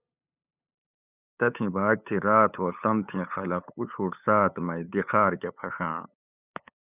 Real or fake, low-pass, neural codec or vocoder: fake; 3.6 kHz; codec, 16 kHz, 8 kbps, FunCodec, trained on LibriTTS, 25 frames a second